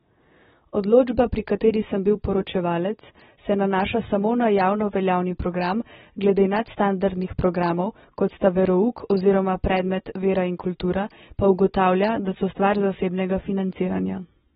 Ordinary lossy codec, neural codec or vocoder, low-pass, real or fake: AAC, 16 kbps; none; 19.8 kHz; real